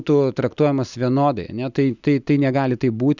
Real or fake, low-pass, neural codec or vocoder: real; 7.2 kHz; none